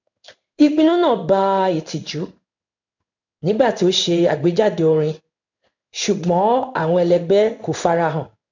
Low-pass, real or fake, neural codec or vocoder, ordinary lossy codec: 7.2 kHz; fake; codec, 16 kHz in and 24 kHz out, 1 kbps, XY-Tokenizer; none